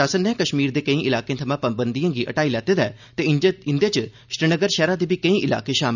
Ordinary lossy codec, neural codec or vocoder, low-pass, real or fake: none; none; 7.2 kHz; real